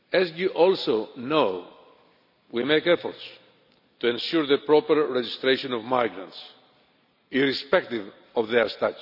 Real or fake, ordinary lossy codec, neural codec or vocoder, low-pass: real; none; none; 5.4 kHz